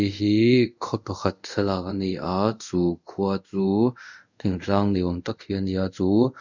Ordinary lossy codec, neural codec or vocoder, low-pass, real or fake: none; codec, 24 kHz, 0.5 kbps, DualCodec; 7.2 kHz; fake